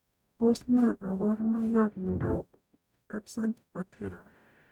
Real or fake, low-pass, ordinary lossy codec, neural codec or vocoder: fake; 19.8 kHz; none; codec, 44.1 kHz, 0.9 kbps, DAC